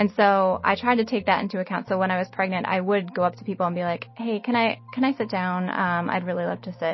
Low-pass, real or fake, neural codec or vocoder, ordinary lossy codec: 7.2 kHz; real; none; MP3, 24 kbps